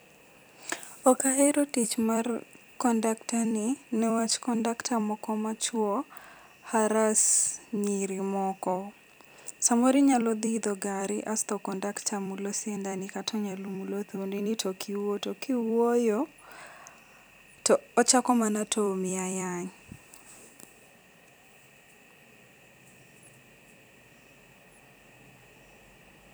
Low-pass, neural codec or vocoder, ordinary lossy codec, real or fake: none; vocoder, 44.1 kHz, 128 mel bands every 256 samples, BigVGAN v2; none; fake